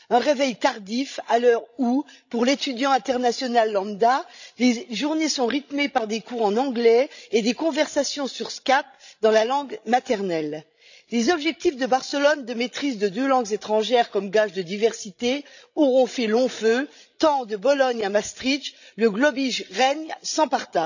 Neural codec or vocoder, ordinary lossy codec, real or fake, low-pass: codec, 16 kHz, 16 kbps, FreqCodec, larger model; none; fake; 7.2 kHz